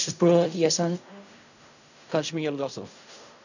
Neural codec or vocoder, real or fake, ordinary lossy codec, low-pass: codec, 16 kHz in and 24 kHz out, 0.4 kbps, LongCat-Audio-Codec, fine tuned four codebook decoder; fake; none; 7.2 kHz